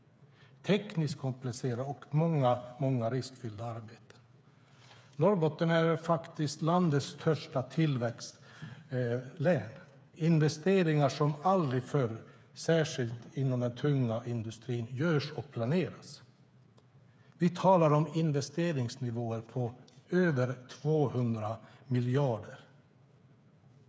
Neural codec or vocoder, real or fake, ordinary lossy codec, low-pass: codec, 16 kHz, 8 kbps, FreqCodec, smaller model; fake; none; none